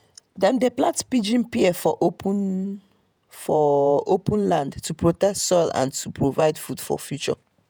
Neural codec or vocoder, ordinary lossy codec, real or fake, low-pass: vocoder, 48 kHz, 128 mel bands, Vocos; none; fake; none